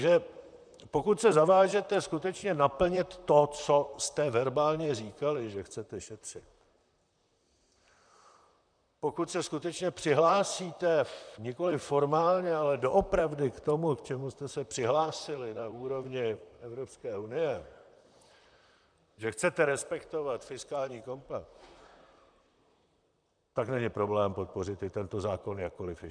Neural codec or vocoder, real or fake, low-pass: vocoder, 44.1 kHz, 128 mel bands, Pupu-Vocoder; fake; 9.9 kHz